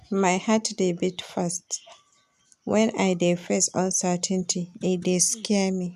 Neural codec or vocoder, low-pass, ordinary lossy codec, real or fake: none; 14.4 kHz; none; real